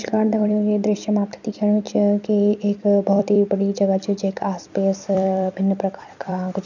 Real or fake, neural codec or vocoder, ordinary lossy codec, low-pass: fake; vocoder, 44.1 kHz, 128 mel bands every 256 samples, BigVGAN v2; none; 7.2 kHz